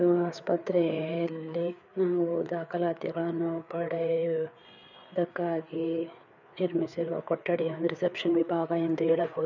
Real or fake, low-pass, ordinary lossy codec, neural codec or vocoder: fake; 7.2 kHz; none; codec, 16 kHz, 4 kbps, FreqCodec, larger model